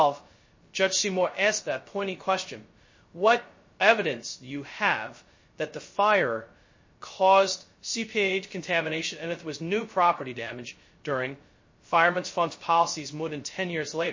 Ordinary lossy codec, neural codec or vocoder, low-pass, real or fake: MP3, 32 kbps; codec, 16 kHz, 0.2 kbps, FocalCodec; 7.2 kHz; fake